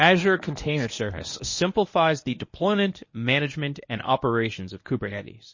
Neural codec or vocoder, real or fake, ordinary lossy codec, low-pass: codec, 24 kHz, 0.9 kbps, WavTokenizer, medium speech release version 1; fake; MP3, 32 kbps; 7.2 kHz